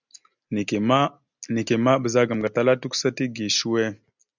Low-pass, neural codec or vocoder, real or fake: 7.2 kHz; none; real